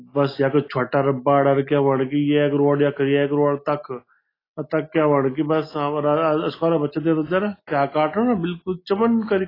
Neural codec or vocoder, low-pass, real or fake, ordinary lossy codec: none; 5.4 kHz; real; AAC, 24 kbps